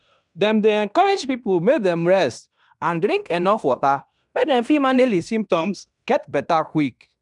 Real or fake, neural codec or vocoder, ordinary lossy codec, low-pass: fake; codec, 16 kHz in and 24 kHz out, 0.9 kbps, LongCat-Audio-Codec, fine tuned four codebook decoder; none; 10.8 kHz